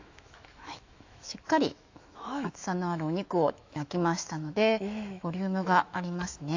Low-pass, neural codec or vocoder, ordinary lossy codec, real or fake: 7.2 kHz; autoencoder, 48 kHz, 128 numbers a frame, DAC-VAE, trained on Japanese speech; AAC, 48 kbps; fake